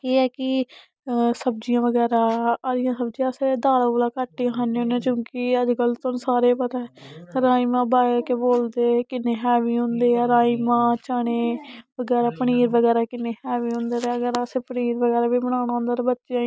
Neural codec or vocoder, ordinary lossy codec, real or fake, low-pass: none; none; real; none